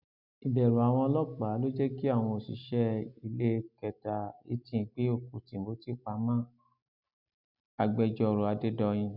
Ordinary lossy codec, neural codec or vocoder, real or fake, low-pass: none; none; real; 5.4 kHz